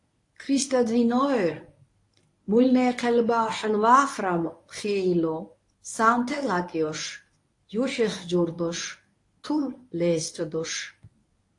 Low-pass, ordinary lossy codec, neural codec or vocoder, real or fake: 10.8 kHz; AAC, 48 kbps; codec, 24 kHz, 0.9 kbps, WavTokenizer, medium speech release version 1; fake